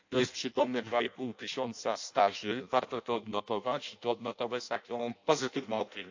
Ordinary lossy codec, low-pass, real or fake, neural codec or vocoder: AAC, 48 kbps; 7.2 kHz; fake; codec, 16 kHz in and 24 kHz out, 0.6 kbps, FireRedTTS-2 codec